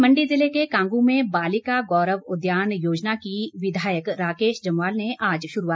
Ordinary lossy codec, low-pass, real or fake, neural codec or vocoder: none; none; real; none